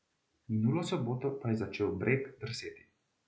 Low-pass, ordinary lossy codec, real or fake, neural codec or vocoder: none; none; real; none